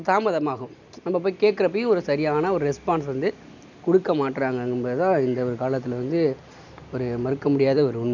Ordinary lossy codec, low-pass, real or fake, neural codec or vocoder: none; 7.2 kHz; real; none